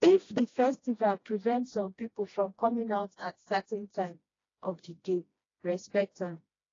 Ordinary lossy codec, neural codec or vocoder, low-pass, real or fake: AAC, 32 kbps; codec, 16 kHz, 1 kbps, FreqCodec, smaller model; 7.2 kHz; fake